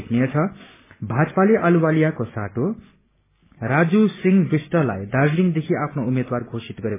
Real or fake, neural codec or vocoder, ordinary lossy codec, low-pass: real; none; MP3, 16 kbps; 3.6 kHz